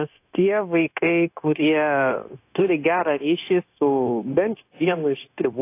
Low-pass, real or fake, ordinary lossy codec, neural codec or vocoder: 3.6 kHz; fake; AAC, 24 kbps; codec, 16 kHz, 0.9 kbps, LongCat-Audio-Codec